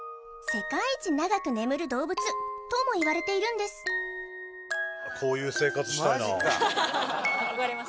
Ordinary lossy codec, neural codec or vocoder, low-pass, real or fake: none; none; none; real